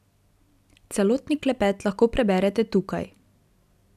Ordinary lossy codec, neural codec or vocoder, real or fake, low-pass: none; none; real; 14.4 kHz